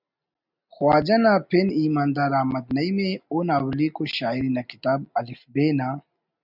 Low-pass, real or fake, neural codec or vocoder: 5.4 kHz; real; none